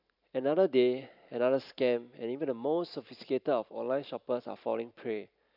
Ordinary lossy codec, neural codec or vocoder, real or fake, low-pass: none; vocoder, 44.1 kHz, 128 mel bands every 512 samples, BigVGAN v2; fake; 5.4 kHz